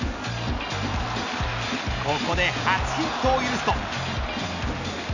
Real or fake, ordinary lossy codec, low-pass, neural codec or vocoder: real; none; 7.2 kHz; none